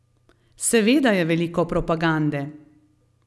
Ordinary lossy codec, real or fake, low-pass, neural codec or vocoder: none; real; none; none